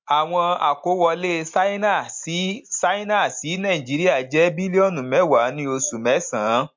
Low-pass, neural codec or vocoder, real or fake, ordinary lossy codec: 7.2 kHz; none; real; MP3, 48 kbps